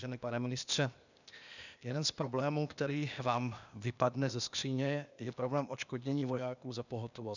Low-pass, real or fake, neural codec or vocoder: 7.2 kHz; fake; codec, 16 kHz, 0.8 kbps, ZipCodec